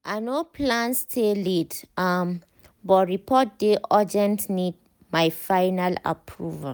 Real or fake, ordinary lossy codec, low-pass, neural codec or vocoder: real; none; none; none